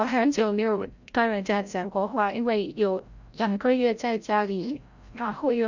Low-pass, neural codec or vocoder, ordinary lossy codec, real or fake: 7.2 kHz; codec, 16 kHz, 0.5 kbps, FreqCodec, larger model; Opus, 64 kbps; fake